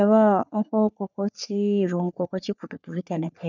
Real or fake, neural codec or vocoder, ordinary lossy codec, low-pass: fake; codec, 44.1 kHz, 3.4 kbps, Pupu-Codec; none; 7.2 kHz